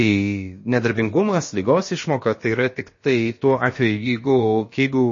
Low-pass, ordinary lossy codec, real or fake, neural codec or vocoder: 7.2 kHz; MP3, 32 kbps; fake; codec, 16 kHz, about 1 kbps, DyCAST, with the encoder's durations